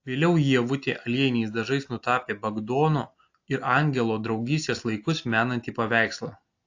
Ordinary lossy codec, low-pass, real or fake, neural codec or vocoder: AAC, 48 kbps; 7.2 kHz; real; none